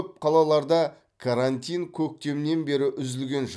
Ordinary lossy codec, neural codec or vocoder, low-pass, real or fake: none; none; none; real